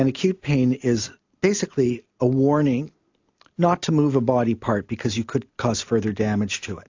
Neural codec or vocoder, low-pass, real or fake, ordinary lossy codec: none; 7.2 kHz; real; AAC, 48 kbps